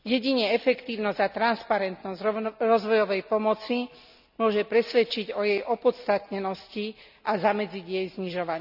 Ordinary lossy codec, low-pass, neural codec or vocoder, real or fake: none; 5.4 kHz; none; real